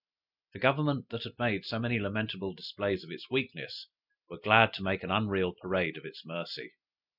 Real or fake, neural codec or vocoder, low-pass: real; none; 5.4 kHz